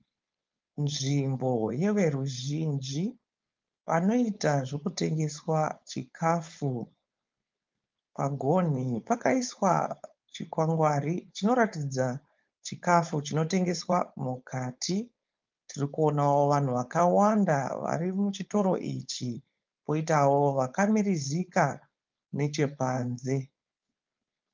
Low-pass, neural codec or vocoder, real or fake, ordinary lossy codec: 7.2 kHz; codec, 16 kHz, 4.8 kbps, FACodec; fake; Opus, 24 kbps